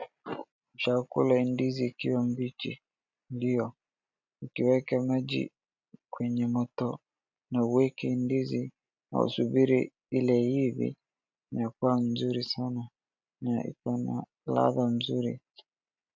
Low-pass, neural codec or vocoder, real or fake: 7.2 kHz; none; real